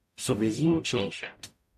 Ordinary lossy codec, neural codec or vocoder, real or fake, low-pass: AAC, 96 kbps; codec, 44.1 kHz, 0.9 kbps, DAC; fake; 14.4 kHz